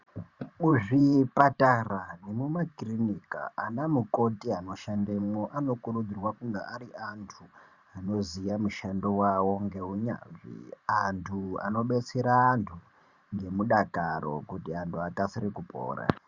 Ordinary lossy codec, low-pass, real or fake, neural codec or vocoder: Opus, 64 kbps; 7.2 kHz; fake; vocoder, 44.1 kHz, 128 mel bands every 512 samples, BigVGAN v2